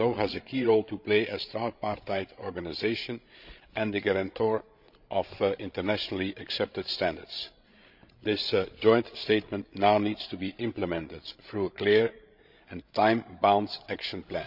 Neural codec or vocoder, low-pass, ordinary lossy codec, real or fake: codec, 16 kHz, 16 kbps, FreqCodec, larger model; 5.4 kHz; none; fake